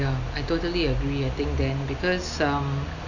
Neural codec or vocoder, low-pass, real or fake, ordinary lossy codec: none; 7.2 kHz; real; none